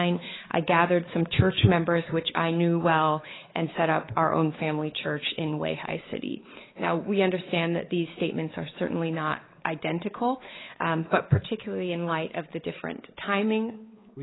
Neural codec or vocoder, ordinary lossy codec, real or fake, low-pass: codec, 16 kHz, 4 kbps, X-Codec, WavLM features, trained on Multilingual LibriSpeech; AAC, 16 kbps; fake; 7.2 kHz